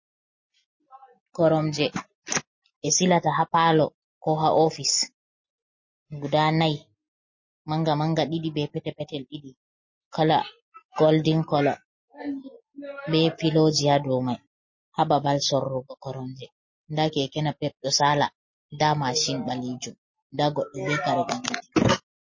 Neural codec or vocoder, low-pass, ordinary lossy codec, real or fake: none; 7.2 kHz; MP3, 32 kbps; real